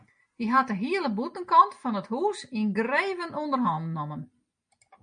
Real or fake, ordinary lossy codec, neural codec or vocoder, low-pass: real; MP3, 48 kbps; none; 9.9 kHz